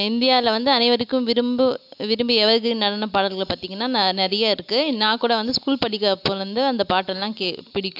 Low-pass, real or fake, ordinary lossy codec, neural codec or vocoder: 5.4 kHz; real; AAC, 48 kbps; none